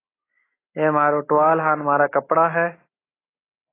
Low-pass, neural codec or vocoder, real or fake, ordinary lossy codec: 3.6 kHz; none; real; AAC, 16 kbps